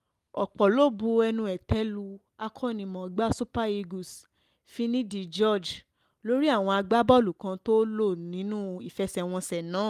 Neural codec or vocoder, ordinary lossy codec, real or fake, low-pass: none; Opus, 32 kbps; real; 14.4 kHz